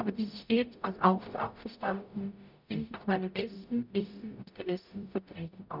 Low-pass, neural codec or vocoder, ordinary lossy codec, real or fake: 5.4 kHz; codec, 44.1 kHz, 0.9 kbps, DAC; none; fake